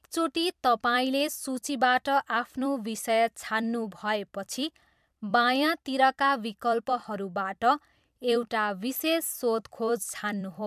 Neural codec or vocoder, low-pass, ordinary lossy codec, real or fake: vocoder, 44.1 kHz, 128 mel bands every 256 samples, BigVGAN v2; 14.4 kHz; MP3, 96 kbps; fake